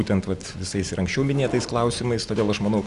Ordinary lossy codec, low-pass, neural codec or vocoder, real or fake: Opus, 64 kbps; 10.8 kHz; none; real